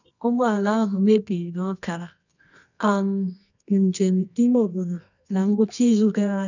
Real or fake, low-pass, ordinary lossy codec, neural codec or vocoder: fake; 7.2 kHz; none; codec, 24 kHz, 0.9 kbps, WavTokenizer, medium music audio release